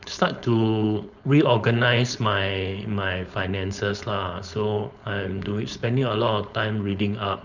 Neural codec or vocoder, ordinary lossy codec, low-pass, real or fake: codec, 16 kHz, 4.8 kbps, FACodec; none; 7.2 kHz; fake